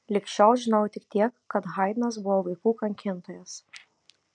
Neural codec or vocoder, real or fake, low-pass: none; real; 9.9 kHz